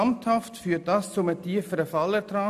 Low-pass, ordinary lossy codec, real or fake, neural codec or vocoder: 14.4 kHz; none; real; none